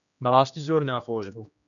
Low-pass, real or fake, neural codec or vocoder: 7.2 kHz; fake; codec, 16 kHz, 1 kbps, X-Codec, HuBERT features, trained on general audio